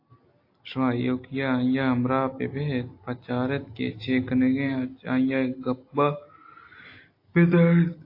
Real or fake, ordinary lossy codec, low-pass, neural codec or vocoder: real; AAC, 32 kbps; 5.4 kHz; none